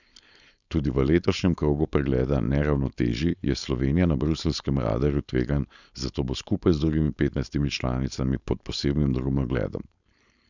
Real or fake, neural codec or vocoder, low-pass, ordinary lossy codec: fake; codec, 16 kHz, 4.8 kbps, FACodec; 7.2 kHz; none